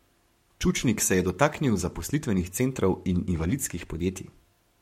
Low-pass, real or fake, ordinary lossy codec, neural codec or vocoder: 19.8 kHz; fake; MP3, 64 kbps; codec, 44.1 kHz, 7.8 kbps, Pupu-Codec